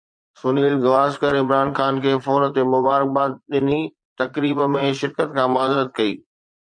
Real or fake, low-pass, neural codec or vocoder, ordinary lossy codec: fake; 9.9 kHz; vocoder, 22.05 kHz, 80 mel bands, Vocos; MP3, 48 kbps